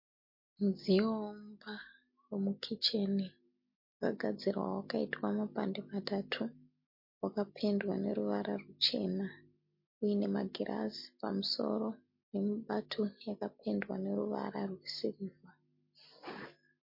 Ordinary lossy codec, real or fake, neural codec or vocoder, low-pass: MP3, 32 kbps; real; none; 5.4 kHz